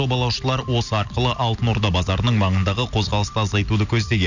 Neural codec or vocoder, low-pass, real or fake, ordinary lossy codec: none; 7.2 kHz; real; none